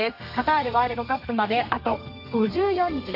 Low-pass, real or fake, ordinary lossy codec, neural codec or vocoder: 5.4 kHz; fake; none; codec, 32 kHz, 1.9 kbps, SNAC